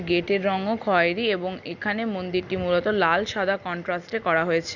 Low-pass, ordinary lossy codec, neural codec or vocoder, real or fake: 7.2 kHz; none; none; real